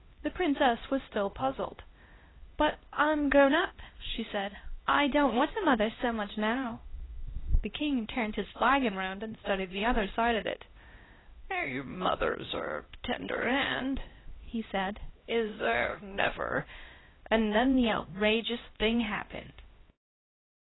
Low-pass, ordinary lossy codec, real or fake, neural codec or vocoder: 7.2 kHz; AAC, 16 kbps; fake; codec, 16 kHz, 1 kbps, X-Codec, HuBERT features, trained on LibriSpeech